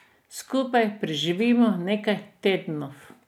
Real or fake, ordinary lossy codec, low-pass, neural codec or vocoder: real; none; 19.8 kHz; none